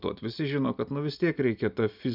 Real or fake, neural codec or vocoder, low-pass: real; none; 5.4 kHz